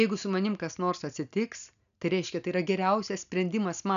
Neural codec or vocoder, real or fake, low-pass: none; real; 7.2 kHz